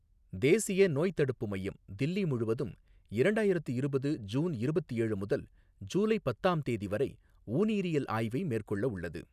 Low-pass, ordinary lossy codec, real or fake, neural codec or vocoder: 14.4 kHz; none; real; none